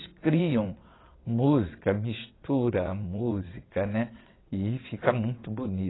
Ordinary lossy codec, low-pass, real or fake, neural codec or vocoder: AAC, 16 kbps; 7.2 kHz; fake; vocoder, 22.05 kHz, 80 mel bands, WaveNeXt